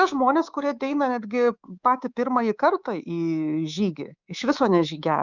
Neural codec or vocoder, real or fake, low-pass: codec, 24 kHz, 3.1 kbps, DualCodec; fake; 7.2 kHz